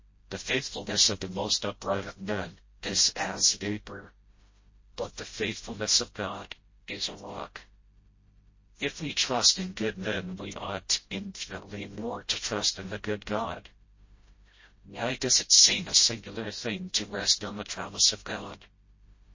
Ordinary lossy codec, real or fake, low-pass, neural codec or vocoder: MP3, 32 kbps; fake; 7.2 kHz; codec, 16 kHz, 0.5 kbps, FreqCodec, smaller model